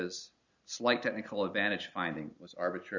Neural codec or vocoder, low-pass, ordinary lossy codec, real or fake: none; 7.2 kHz; Opus, 64 kbps; real